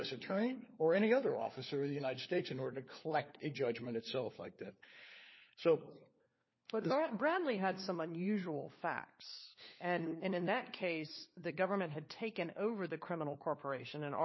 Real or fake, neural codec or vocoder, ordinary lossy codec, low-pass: fake; codec, 16 kHz, 4 kbps, FunCodec, trained on LibriTTS, 50 frames a second; MP3, 24 kbps; 7.2 kHz